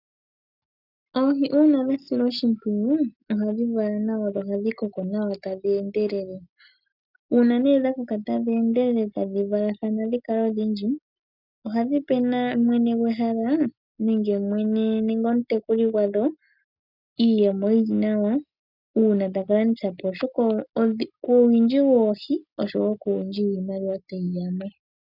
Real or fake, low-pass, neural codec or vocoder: real; 5.4 kHz; none